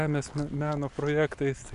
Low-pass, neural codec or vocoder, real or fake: 10.8 kHz; none; real